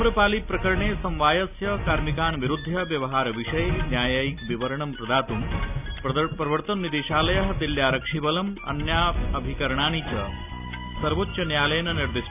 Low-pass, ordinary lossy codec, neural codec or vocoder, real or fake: 3.6 kHz; none; none; real